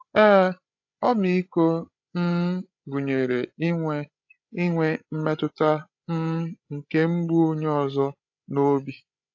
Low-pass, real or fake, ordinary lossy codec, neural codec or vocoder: 7.2 kHz; fake; AAC, 48 kbps; codec, 16 kHz, 16 kbps, FreqCodec, larger model